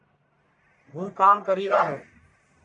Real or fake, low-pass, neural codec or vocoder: fake; 10.8 kHz; codec, 44.1 kHz, 1.7 kbps, Pupu-Codec